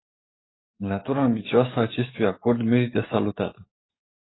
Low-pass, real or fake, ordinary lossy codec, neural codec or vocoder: 7.2 kHz; fake; AAC, 16 kbps; codec, 44.1 kHz, 7.8 kbps, DAC